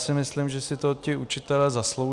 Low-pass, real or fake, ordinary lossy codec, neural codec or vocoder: 10.8 kHz; real; Opus, 64 kbps; none